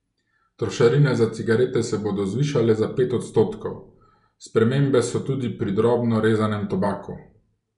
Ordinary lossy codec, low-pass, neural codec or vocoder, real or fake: none; 10.8 kHz; none; real